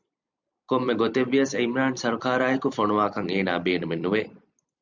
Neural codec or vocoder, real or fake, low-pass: vocoder, 44.1 kHz, 128 mel bands every 256 samples, BigVGAN v2; fake; 7.2 kHz